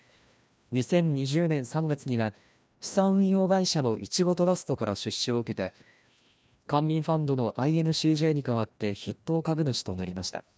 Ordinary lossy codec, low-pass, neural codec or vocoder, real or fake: none; none; codec, 16 kHz, 1 kbps, FreqCodec, larger model; fake